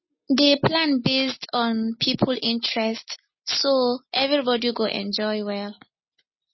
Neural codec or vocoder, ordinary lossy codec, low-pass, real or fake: none; MP3, 24 kbps; 7.2 kHz; real